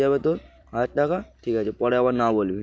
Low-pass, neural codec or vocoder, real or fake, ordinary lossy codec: none; none; real; none